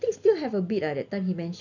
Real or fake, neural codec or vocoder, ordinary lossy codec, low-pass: real; none; none; 7.2 kHz